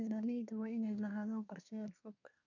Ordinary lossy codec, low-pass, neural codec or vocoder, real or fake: none; 7.2 kHz; codec, 24 kHz, 1 kbps, SNAC; fake